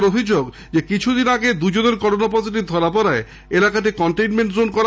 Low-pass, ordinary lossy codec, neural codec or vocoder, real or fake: none; none; none; real